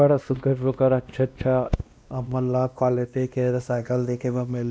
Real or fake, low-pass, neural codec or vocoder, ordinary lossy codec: fake; none; codec, 16 kHz, 1 kbps, X-Codec, WavLM features, trained on Multilingual LibriSpeech; none